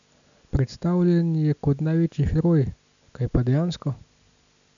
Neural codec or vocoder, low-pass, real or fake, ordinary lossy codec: none; 7.2 kHz; real; none